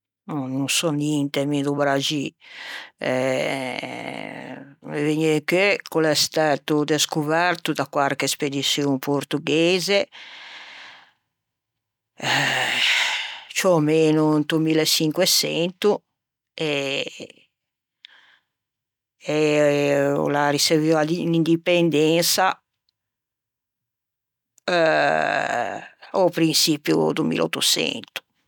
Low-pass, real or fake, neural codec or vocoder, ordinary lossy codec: 19.8 kHz; real; none; none